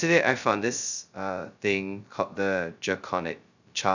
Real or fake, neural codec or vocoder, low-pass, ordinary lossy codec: fake; codec, 16 kHz, 0.2 kbps, FocalCodec; 7.2 kHz; none